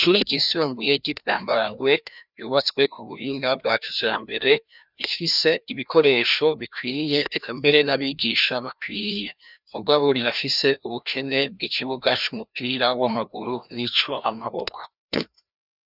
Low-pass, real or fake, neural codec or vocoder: 5.4 kHz; fake; codec, 16 kHz, 1 kbps, FreqCodec, larger model